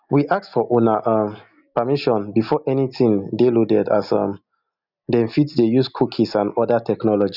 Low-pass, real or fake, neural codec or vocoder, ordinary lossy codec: 5.4 kHz; real; none; none